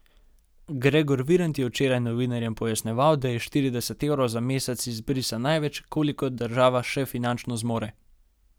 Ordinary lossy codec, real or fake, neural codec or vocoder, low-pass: none; real; none; none